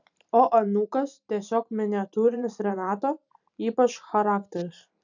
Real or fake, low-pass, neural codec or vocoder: fake; 7.2 kHz; vocoder, 44.1 kHz, 128 mel bands every 512 samples, BigVGAN v2